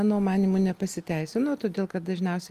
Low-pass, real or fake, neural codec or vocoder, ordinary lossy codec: 14.4 kHz; real; none; Opus, 32 kbps